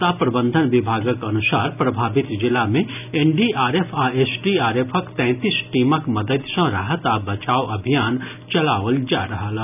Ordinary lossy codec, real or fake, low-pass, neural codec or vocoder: none; real; 3.6 kHz; none